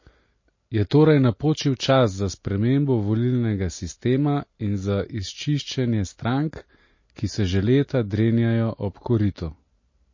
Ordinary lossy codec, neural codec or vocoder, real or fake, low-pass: MP3, 32 kbps; none; real; 7.2 kHz